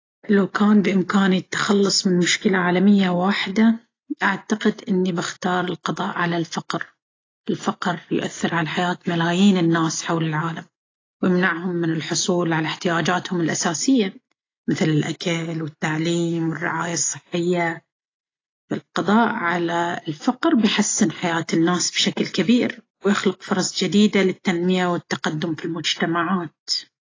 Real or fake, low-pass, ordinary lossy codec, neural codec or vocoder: real; 7.2 kHz; AAC, 32 kbps; none